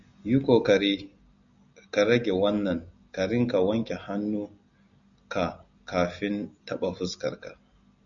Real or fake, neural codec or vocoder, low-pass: real; none; 7.2 kHz